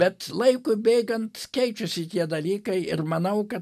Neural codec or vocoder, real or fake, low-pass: none; real; 14.4 kHz